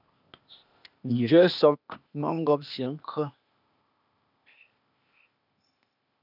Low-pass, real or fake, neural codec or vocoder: 5.4 kHz; fake; codec, 16 kHz, 0.8 kbps, ZipCodec